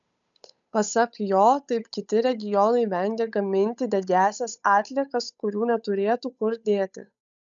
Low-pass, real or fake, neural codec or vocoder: 7.2 kHz; fake; codec, 16 kHz, 8 kbps, FunCodec, trained on Chinese and English, 25 frames a second